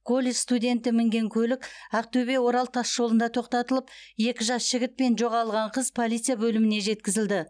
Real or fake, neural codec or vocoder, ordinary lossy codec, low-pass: real; none; none; 9.9 kHz